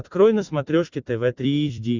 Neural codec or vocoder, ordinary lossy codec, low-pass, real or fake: vocoder, 44.1 kHz, 128 mel bands every 256 samples, BigVGAN v2; Opus, 64 kbps; 7.2 kHz; fake